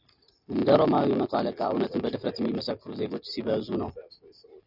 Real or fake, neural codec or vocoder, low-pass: real; none; 5.4 kHz